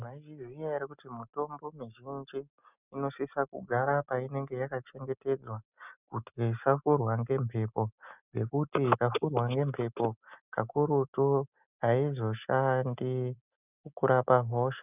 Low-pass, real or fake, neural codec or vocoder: 3.6 kHz; real; none